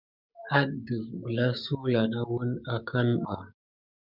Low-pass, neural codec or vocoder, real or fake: 5.4 kHz; codec, 16 kHz, 6 kbps, DAC; fake